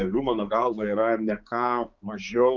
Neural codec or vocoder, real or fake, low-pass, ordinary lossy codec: codec, 16 kHz, 4 kbps, X-Codec, HuBERT features, trained on balanced general audio; fake; 7.2 kHz; Opus, 24 kbps